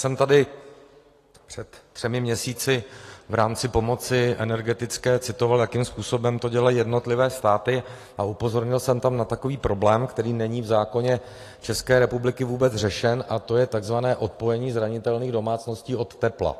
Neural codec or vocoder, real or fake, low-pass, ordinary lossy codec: none; real; 14.4 kHz; AAC, 48 kbps